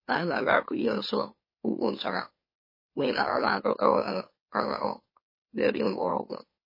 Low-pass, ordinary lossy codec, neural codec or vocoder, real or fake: 5.4 kHz; MP3, 24 kbps; autoencoder, 44.1 kHz, a latent of 192 numbers a frame, MeloTTS; fake